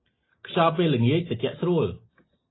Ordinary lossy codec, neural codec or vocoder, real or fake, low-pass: AAC, 16 kbps; none; real; 7.2 kHz